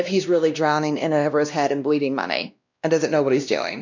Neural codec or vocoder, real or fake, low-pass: codec, 16 kHz, 1 kbps, X-Codec, WavLM features, trained on Multilingual LibriSpeech; fake; 7.2 kHz